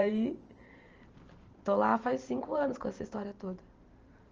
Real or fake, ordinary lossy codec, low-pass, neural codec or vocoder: fake; Opus, 24 kbps; 7.2 kHz; vocoder, 44.1 kHz, 128 mel bands every 512 samples, BigVGAN v2